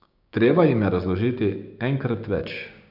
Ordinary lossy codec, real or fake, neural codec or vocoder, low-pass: none; fake; codec, 16 kHz, 6 kbps, DAC; 5.4 kHz